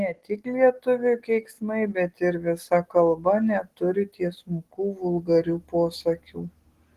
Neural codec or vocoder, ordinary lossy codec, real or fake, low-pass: none; Opus, 16 kbps; real; 14.4 kHz